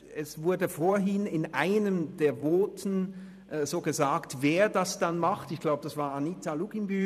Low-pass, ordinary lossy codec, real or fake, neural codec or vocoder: 14.4 kHz; none; fake; vocoder, 44.1 kHz, 128 mel bands every 512 samples, BigVGAN v2